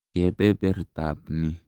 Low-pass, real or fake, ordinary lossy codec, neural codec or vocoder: 19.8 kHz; fake; Opus, 32 kbps; codec, 44.1 kHz, 7.8 kbps, DAC